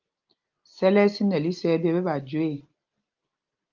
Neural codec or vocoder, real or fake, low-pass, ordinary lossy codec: none; real; 7.2 kHz; Opus, 32 kbps